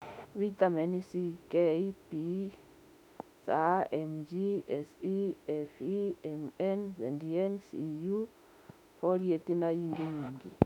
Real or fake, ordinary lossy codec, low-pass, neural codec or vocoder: fake; none; 19.8 kHz; autoencoder, 48 kHz, 32 numbers a frame, DAC-VAE, trained on Japanese speech